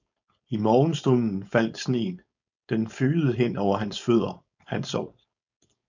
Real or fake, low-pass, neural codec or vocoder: fake; 7.2 kHz; codec, 16 kHz, 4.8 kbps, FACodec